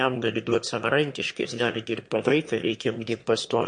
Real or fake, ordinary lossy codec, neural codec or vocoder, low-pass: fake; MP3, 48 kbps; autoencoder, 22.05 kHz, a latent of 192 numbers a frame, VITS, trained on one speaker; 9.9 kHz